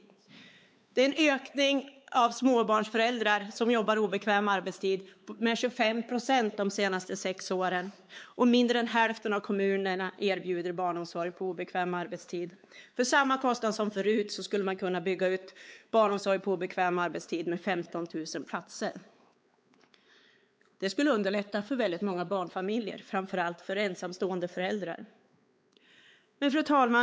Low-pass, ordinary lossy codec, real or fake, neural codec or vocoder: none; none; fake; codec, 16 kHz, 4 kbps, X-Codec, WavLM features, trained on Multilingual LibriSpeech